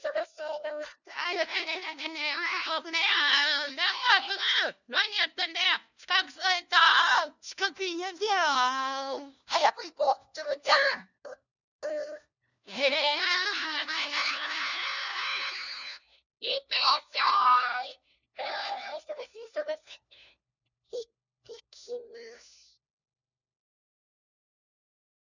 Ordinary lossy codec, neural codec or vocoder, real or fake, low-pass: none; codec, 16 kHz, 1 kbps, FunCodec, trained on LibriTTS, 50 frames a second; fake; 7.2 kHz